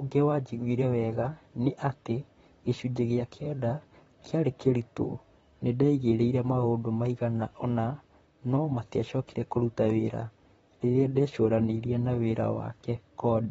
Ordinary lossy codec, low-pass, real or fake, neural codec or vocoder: AAC, 24 kbps; 19.8 kHz; fake; vocoder, 44.1 kHz, 128 mel bands, Pupu-Vocoder